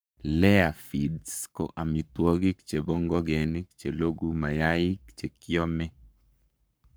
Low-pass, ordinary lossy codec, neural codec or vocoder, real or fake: none; none; codec, 44.1 kHz, 7.8 kbps, Pupu-Codec; fake